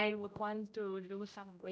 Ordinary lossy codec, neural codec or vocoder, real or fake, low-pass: none; codec, 16 kHz, 0.5 kbps, X-Codec, HuBERT features, trained on general audio; fake; none